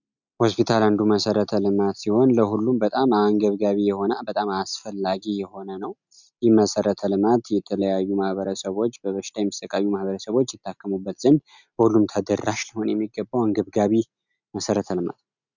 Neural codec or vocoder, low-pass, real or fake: none; 7.2 kHz; real